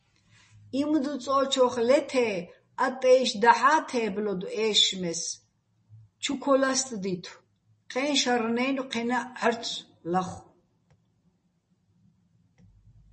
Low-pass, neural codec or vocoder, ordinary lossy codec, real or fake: 10.8 kHz; none; MP3, 32 kbps; real